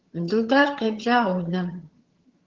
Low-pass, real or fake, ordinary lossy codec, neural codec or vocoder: 7.2 kHz; fake; Opus, 16 kbps; vocoder, 22.05 kHz, 80 mel bands, HiFi-GAN